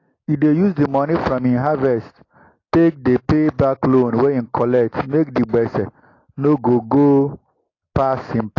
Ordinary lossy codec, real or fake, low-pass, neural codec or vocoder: AAC, 32 kbps; real; 7.2 kHz; none